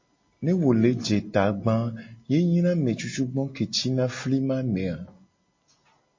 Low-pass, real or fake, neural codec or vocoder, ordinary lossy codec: 7.2 kHz; real; none; MP3, 32 kbps